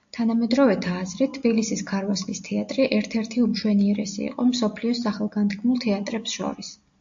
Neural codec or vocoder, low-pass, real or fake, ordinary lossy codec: none; 7.2 kHz; real; AAC, 64 kbps